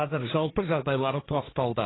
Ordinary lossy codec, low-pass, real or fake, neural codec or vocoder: AAC, 16 kbps; 7.2 kHz; fake; codec, 16 kHz, 1.1 kbps, Voila-Tokenizer